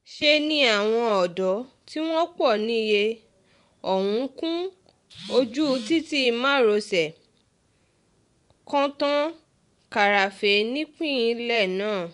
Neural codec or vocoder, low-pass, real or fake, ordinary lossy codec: vocoder, 24 kHz, 100 mel bands, Vocos; 10.8 kHz; fake; none